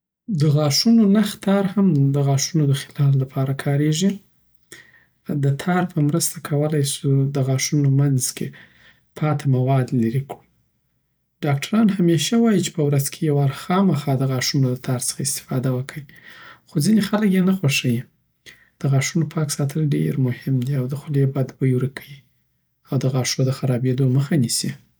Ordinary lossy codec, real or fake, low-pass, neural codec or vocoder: none; real; none; none